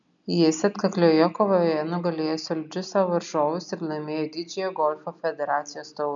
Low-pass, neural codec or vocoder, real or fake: 7.2 kHz; none; real